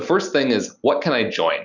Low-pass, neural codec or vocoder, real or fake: 7.2 kHz; none; real